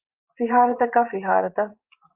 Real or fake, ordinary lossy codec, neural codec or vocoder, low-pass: fake; Opus, 32 kbps; vocoder, 24 kHz, 100 mel bands, Vocos; 3.6 kHz